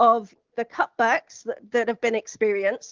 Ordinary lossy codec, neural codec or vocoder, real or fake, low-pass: Opus, 32 kbps; none; real; 7.2 kHz